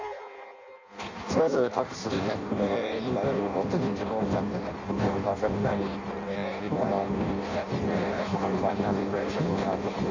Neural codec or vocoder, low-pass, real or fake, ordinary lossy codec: codec, 16 kHz in and 24 kHz out, 0.6 kbps, FireRedTTS-2 codec; 7.2 kHz; fake; AAC, 48 kbps